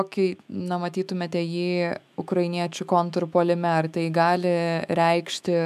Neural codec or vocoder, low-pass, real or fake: autoencoder, 48 kHz, 128 numbers a frame, DAC-VAE, trained on Japanese speech; 14.4 kHz; fake